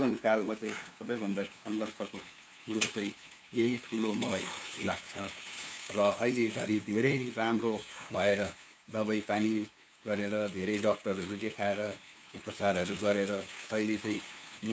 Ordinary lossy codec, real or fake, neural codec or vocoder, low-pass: none; fake; codec, 16 kHz, 2 kbps, FunCodec, trained on LibriTTS, 25 frames a second; none